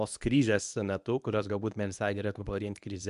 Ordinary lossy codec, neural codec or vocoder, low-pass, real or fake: AAC, 96 kbps; codec, 24 kHz, 0.9 kbps, WavTokenizer, medium speech release version 1; 10.8 kHz; fake